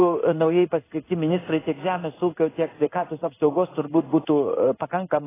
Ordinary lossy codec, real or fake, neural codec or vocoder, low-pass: AAC, 16 kbps; fake; codec, 16 kHz in and 24 kHz out, 1 kbps, XY-Tokenizer; 3.6 kHz